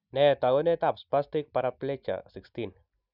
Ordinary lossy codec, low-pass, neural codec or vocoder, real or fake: none; 5.4 kHz; none; real